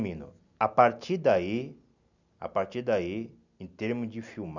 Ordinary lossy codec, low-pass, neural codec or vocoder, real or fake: none; 7.2 kHz; none; real